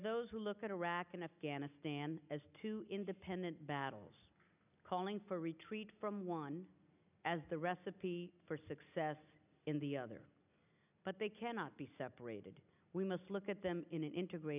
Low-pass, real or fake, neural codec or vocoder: 3.6 kHz; real; none